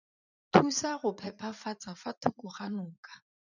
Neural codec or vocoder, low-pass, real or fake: vocoder, 44.1 kHz, 80 mel bands, Vocos; 7.2 kHz; fake